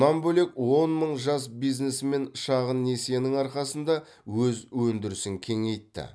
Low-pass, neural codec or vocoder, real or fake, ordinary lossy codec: none; none; real; none